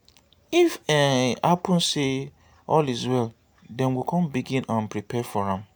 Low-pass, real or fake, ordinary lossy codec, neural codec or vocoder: none; real; none; none